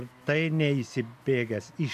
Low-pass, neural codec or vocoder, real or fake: 14.4 kHz; none; real